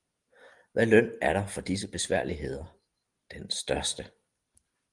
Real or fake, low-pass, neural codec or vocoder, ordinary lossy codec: real; 10.8 kHz; none; Opus, 24 kbps